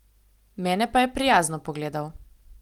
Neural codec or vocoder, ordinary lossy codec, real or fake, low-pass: none; Opus, 24 kbps; real; 19.8 kHz